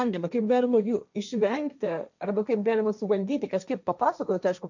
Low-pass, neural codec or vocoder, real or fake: 7.2 kHz; codec, 16 kHz, 1.1 kbps, Voila-Tokenizer; fake